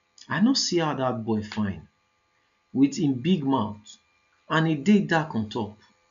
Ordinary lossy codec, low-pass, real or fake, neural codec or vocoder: none; 7.2 kHz; real; none